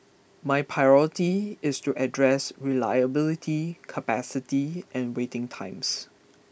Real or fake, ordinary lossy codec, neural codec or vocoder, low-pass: real; none; none; none